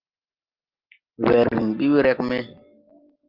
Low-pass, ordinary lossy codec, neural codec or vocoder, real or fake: 5.4 kHz; Opus, 24 kbps; none; real